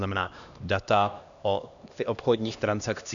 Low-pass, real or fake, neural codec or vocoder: 7.2 kHz; fake; codec, 16 kHz, 1 kbps, X-Codec, HuBERT features, trained on LibriSpeech